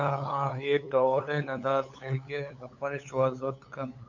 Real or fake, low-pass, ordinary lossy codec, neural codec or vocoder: fake; 7.2 kHz; MP3, 64 kbps; codec, 16 kHz, 8 kbps, FunCodec, trained on LibriTTS, 25 frames a second